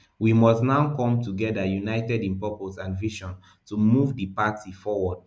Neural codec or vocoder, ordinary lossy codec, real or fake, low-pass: none; none; real; none